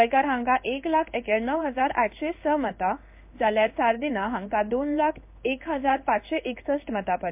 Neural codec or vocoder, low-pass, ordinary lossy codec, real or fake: codec, 16 kHz in and 24 kHz out, 1 kbps, XY-Tokenizer; 3.6 kHz; MP3, 32 kbps; fake